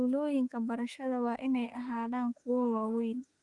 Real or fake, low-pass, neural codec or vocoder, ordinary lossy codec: fake; 10.8 kHz; autoencoder, 48 kHz, 32 numbers a frame, DAC-VAE, trained on Japanese speech; Opus, 32 kbps